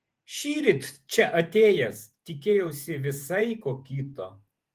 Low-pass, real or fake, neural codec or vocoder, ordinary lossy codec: 14.4 kHz; fake; vocoder, 48 kHz, 128 mel bands, Vocos; Opus, 24 kbps